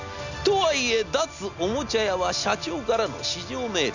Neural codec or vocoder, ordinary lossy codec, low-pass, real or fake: none; none; 7.2 kHz; real